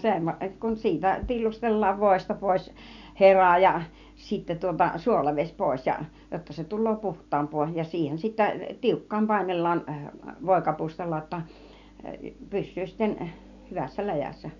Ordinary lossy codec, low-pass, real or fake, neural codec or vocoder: none; 7.2 kHz; real; none